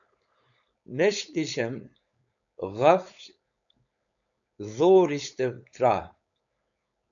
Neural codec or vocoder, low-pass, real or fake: codec, 16 kHz, 4.8 kbps, FACodec; 7.2 kHz; fake